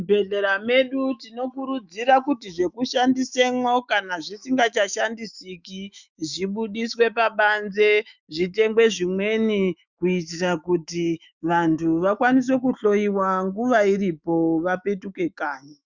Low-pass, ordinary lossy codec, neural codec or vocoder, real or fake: 7.2 kHz; Opus, 64 kbps; autoencoder, 48 kHz, 128 numbers a frame, DAC-VAE, trained on Japanese speech; fake